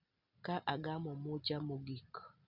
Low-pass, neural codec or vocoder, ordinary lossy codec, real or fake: 5.4 kHz; none; none; real